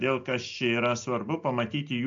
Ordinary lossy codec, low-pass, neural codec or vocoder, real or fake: MP3, 48 kbps; 7.2 kHz; none; real